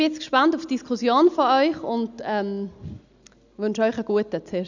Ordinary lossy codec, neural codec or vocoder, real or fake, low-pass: none; none; real; 7.2 kHz